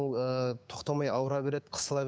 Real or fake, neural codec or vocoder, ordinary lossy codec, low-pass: fake; codec, 16 kHz, 6 kbps, DAC; none; none